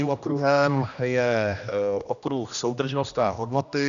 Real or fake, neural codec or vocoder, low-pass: fake; codec, 16 kHz, 1 kbps, X-Codec, HuBERT features, trained on general audio; 7.2 kHz